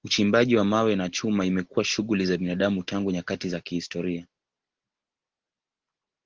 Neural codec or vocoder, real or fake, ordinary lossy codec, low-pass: none; real; Opus, 16 kbps; 7.2 kHz